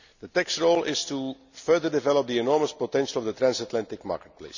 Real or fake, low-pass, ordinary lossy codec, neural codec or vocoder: real; 7.2 kHz; none; none